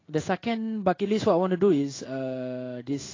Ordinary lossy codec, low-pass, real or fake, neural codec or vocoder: AAC, 32 kbps; 7.2 kHz; fake; codec, 16 kHz in and 24 kHz out, 1 kbps, XY-Tokenizer